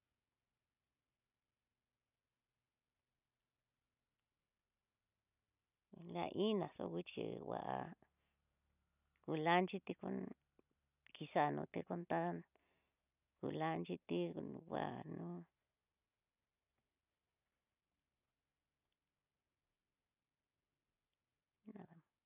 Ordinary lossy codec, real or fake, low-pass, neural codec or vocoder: none; real; 3.6 kHz; none